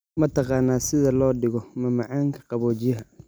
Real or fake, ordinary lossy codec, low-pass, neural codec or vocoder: real; none; none; none